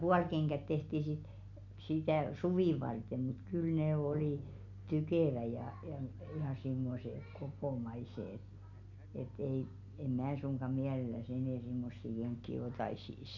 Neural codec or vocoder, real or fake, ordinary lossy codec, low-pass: none; real; none; 7.2 kHz